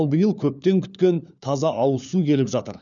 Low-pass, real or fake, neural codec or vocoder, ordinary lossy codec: 7.2 kHz; fake; codec, 16 kHz, 4 kbps, FunCodec, trained on LibriTTS, 50 frames a second; none